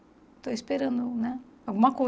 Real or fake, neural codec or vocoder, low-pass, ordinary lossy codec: real; none; none; none